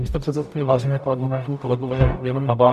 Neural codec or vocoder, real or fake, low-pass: codec, 44.1 kHz, 0.9 kbps, DAC; fake; 14.4 kHz